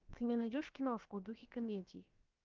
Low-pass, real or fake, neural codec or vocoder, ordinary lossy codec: 7.2 kHz; fake; codec, 16 kHz, about 1 kbps, DyCAST, with the encoder's durations; Opus, 24 kbps